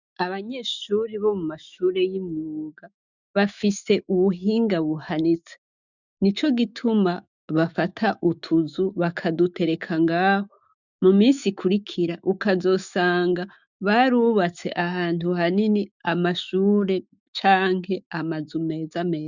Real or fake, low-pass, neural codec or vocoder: fake; 7.2 kHz; autoencoder, 48 kHz, 128 numbers a frame, DAC-VAE, trained on Japanese speech